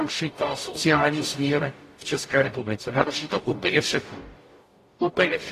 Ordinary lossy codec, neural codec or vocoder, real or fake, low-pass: AAC, 48 kbps; codec, 44.1 kHz, 0.9 kbps, DAC; fake; 14.4 kHz